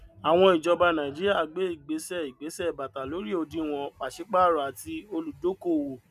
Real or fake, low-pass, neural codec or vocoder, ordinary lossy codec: real; 14.4 kHz; none; none